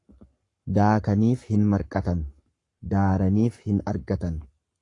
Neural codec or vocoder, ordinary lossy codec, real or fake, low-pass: codec, 44.1 kHz, 7.8 kbps, Pupu-Codec; AAC, 48 kbps; fake; 10.8 kHz